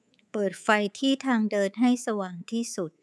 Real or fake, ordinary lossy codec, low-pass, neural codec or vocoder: fake; none; none; codec, 24 kHz, 3.1 kbps, DualCodec